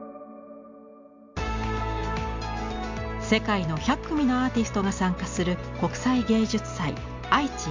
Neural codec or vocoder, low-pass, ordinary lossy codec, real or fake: none; 7.2 kHz; MP3, 64 kbps; real